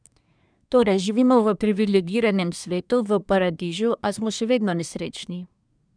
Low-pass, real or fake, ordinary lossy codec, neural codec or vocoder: 9.9 kHz; fake; none; codec, 24 kHz, 1 kbps, SNAC